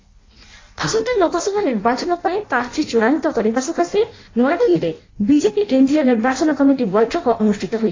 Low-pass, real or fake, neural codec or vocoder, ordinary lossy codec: 7.2 kHz; fake; codec, 16 kHz in and 24 kHz out, 0.6 kbps, FireRedTTS-2 codec; AAC, 32 kbps